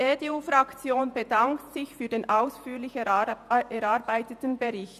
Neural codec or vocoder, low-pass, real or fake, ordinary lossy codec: vocoder, 48 kHz, 128 mel bands, Vocos; 14.4 kHz; fake; none